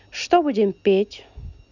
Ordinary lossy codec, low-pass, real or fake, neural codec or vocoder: none; 7.2 kHz; real; none